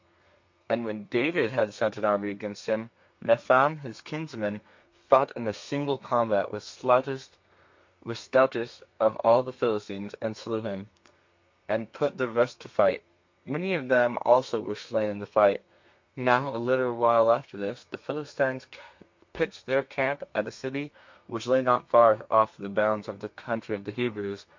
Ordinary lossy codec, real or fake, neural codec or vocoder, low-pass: MP3, 48 kbps; fake; codec, 32 kHz, 1.9 kbps, SNAC; 7.2 kHz